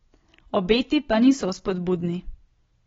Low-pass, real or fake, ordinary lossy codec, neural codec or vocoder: 7.2 kHz; real; AAC, 24 kbps; none